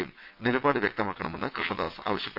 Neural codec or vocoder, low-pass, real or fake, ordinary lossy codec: vocoder, 22.05 kHz, 80 mel bands, WaveNeXt; 5.4 kHz; fake; none